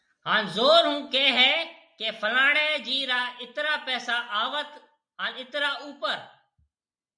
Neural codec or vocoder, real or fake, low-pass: none; real; 9.9 kHz